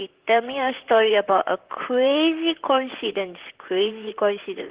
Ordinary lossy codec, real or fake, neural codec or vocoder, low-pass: Opus, 16 kbps; fake; vocoder, 44.1 kHz, 128 mel bands, Pupu-Vocoder; 3.6 kHz